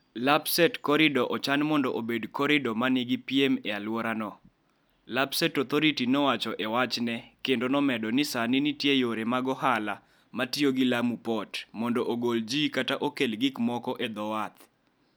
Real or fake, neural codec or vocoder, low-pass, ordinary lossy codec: real; none; none; none